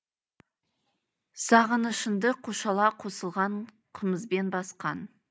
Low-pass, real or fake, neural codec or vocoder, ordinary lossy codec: none; real; none; none